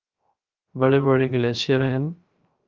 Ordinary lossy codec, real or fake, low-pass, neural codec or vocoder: Opus, 24 kbps; fake; 7.2 kHz; codec, 16 kHz, 0.3 kbps, FocalCodec